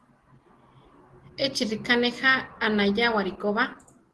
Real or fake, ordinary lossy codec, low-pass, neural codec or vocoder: real; Opus, 16 kbps; 10.8 kHz; none